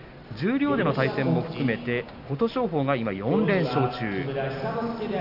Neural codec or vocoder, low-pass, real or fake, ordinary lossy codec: none; 5.4 kHz; real; none